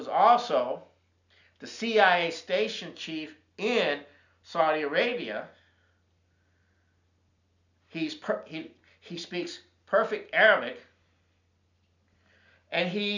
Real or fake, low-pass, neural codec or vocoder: real; 7.2 kHz; none